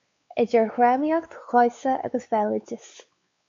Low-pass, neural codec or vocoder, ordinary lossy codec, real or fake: 7.2 kHz; codec, 16 kHz, 4 kbps, X-Codec, WavLM features, trained on Multilingual LibriSpeech; MP3, 48 kbps; fake